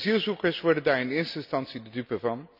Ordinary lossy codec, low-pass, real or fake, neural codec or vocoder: none; 5.4 kHz; real; none